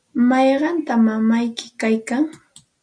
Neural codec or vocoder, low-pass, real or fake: none; 9.9 kHz; real